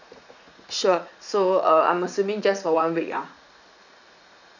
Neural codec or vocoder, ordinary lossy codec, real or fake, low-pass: vocoder, 44.1 kHz, 80 mel bands, Vocos; none; fake; 7.2 kHz